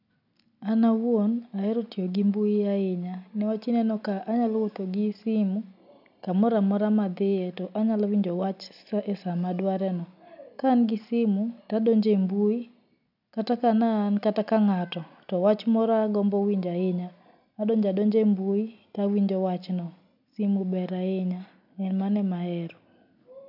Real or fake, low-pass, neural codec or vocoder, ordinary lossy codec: real; 5.4 kHz; none; none